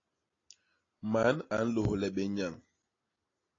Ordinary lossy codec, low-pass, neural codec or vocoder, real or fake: AAC, 32 kbps; 7.2 kHz; none; real